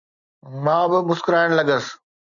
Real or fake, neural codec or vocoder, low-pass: real; none; 7.2 kHz